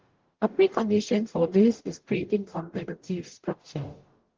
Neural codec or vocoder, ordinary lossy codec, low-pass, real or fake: codec, 44.1 kHz, 0.9 kbps, DAC; Opus, 16 kbps; 7.2 kHz; fake